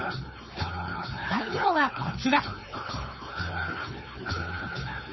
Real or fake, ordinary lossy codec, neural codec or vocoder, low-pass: fake; MP3, 24 kbps; codec, 16 kHz, 4.8 kbps, FACodec; 7.2 kHz